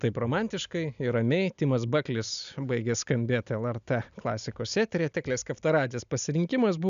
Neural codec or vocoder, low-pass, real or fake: none; 7.2 kHz; real